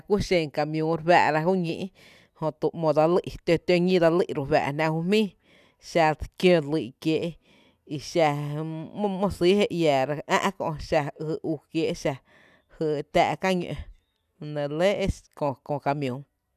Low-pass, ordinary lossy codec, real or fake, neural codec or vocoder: 14.4 kHz; none; real; none